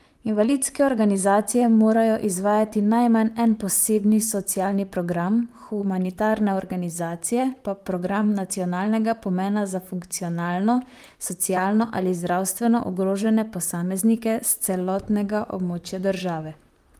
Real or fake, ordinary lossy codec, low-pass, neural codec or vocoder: fake; Opus, 32 kbps; 14.4 kHz; vocoder, 44.1 kHz, 128 mel bands, Pupu-Vocoder